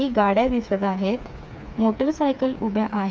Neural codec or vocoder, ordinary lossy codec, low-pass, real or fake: codec, 16 kHz, 4 kbps, FreqCodec, smaller model; none; none; fake